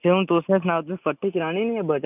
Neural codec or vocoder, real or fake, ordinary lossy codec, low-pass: none; real; none; 3.6 kHz